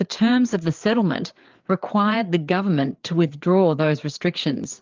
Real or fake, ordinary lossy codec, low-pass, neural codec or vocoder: fake; Opus, 32 kbps; 7.2 kHz; codec, 16 kHz, 4 kbps, FreqCodec, larger model